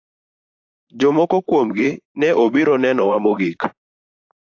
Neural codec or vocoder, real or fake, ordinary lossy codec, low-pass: vocoder, 44.1 kHz, 128 mel bands, Pupu-Vocoder; fake; AAC, 48 kbps; 7.2 kHz